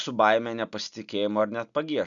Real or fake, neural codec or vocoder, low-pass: real; none; 7.2 kHz